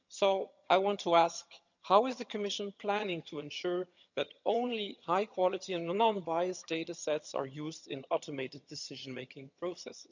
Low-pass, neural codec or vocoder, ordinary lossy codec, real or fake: 7.2 kHz; vocoder, 22.05 kHz, 80 mel bands, HiFi-GAN; none; fake